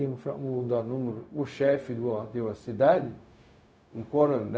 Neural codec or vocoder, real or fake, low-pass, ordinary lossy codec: codec, 16 kHz, 0.4 kbps, LongCat-Audio-Codec; fake; none; none